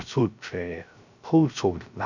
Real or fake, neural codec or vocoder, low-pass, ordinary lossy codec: fake; codec, 16 kHz, 0.3 kbps, FocalCodec; 7.2 kHz; none